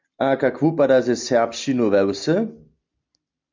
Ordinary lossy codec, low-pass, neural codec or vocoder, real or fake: MP3, 64 kbps; 7.2 kHz; none; real